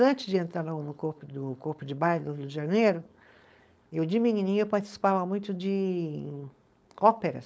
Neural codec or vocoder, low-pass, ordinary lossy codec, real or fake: codec, 16 kHz, 4.8 kbps, FACodec; none; none; fake